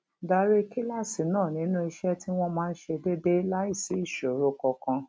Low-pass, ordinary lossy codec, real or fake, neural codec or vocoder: none; none; real; none